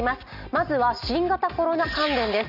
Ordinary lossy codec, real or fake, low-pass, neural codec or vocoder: none; real; 5.4 kHz; none